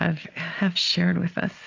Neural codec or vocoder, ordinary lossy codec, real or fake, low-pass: none; AAC, 32 kbps; real; 7.2 kHz